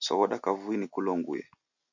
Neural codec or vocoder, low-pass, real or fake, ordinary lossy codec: none; 7.2 kHz; real; AAC, 48 kbps